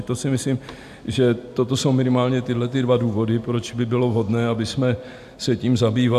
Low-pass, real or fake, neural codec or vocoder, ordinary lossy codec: 14.4 kHz; real; none; AAC, 96 kbps